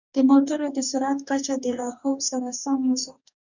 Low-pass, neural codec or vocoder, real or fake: 7.2 kHz; codec, 44.1 kHz, 2.6 kbps, DAC; fake